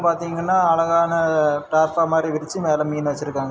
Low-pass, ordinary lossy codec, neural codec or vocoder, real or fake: none; none; none; real